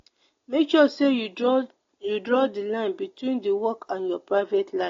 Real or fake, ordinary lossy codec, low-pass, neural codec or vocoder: real; AAC, 32 kbps; 7.2 kHz; none